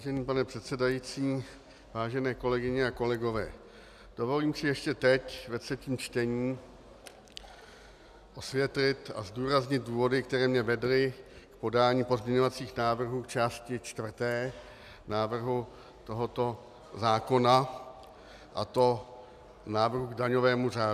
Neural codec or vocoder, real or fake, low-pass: none; real; 14.4 kHz